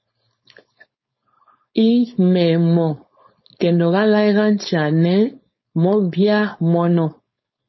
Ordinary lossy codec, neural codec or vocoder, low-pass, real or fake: MP3, 24 kbps; codec, 16 kHz, 4.8 kbps, FACodec; 7.2 kHz; fake